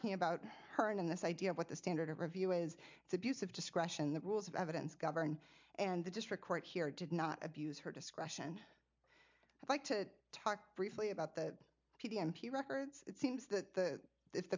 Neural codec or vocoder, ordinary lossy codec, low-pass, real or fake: none; MP3, 64 kbps; 7.2 kHz; real